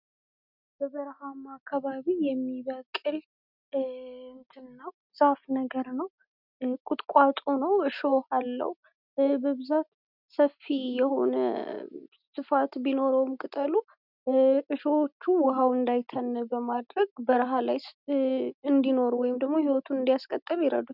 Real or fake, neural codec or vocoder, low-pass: real; none; 5.4 kHz